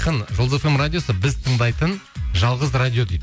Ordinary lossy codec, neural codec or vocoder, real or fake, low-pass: none; none; real; none